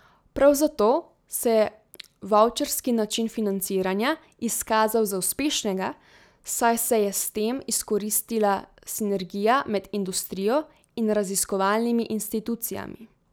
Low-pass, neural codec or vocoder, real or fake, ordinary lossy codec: none; none; real; none